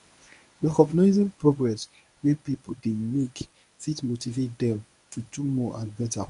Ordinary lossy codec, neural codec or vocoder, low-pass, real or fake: none; codec, 24 kHz, 0.9 kbps, WavTokenizer, medium speech release version 1; 10.8 kHz; fake